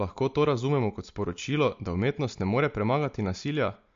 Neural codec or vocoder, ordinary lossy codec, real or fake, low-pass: none; MP3, 48 kbps; real; 7.2 kHz